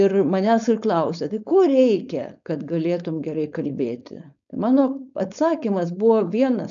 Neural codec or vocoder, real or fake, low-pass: codec, 16 kHz, 4.8 kbps, FACodec; fake; 7.2 kHz